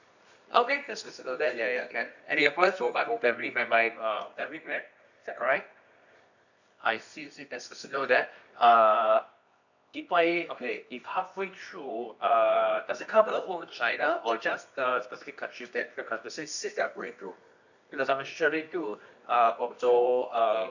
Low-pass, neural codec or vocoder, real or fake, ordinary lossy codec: 7.2 kHz; codec, 24 kHz, 0.9 kbps, WavTokenizer, medium music audio release; fake; none